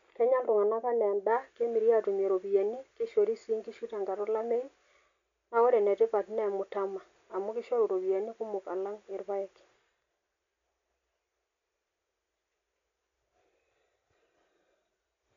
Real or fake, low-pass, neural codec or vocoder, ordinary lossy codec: real; 7.2 kHz; none; MP3, 64 kbps